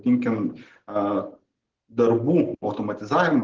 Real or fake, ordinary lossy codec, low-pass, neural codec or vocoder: real; Opus, 16 kbps; 7.2 kHz; none